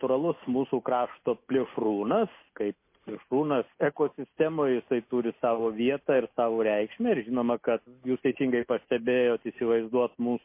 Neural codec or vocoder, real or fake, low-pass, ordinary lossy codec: none; real; 3.6 kHz; MP3, 24 kbps